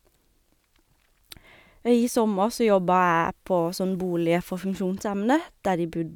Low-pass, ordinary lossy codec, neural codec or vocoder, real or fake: 19.8 kHz; none; none; real